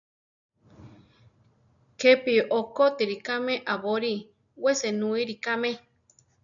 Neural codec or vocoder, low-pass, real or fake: none; 7.2 kHz; real